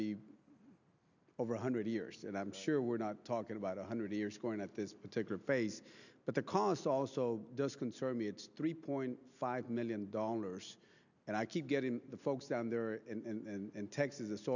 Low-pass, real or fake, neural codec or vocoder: 7.2 kHz; real; none